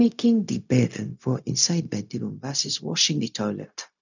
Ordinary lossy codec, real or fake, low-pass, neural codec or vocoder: none; fake; 7.2 kHz; codec, 16 kHz, 0.4 kbps, LongCat-Audio-Codec